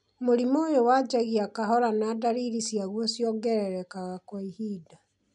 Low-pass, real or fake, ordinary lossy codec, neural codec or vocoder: 10.8 kHz; real; none; none